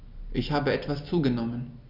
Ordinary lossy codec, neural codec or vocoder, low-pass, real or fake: none; none; 5.4 kHz; real